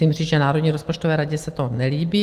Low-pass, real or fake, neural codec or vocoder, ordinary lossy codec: 14.4 kHz; real; none; Opus, 24 kbps